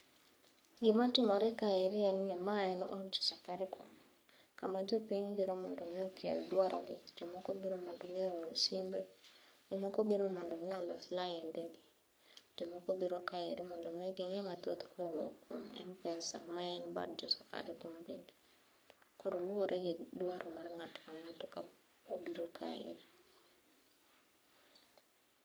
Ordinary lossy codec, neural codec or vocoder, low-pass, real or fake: none; codec, 44.1 kHz, 3.4 kbps, Pupu-Codec; none; fake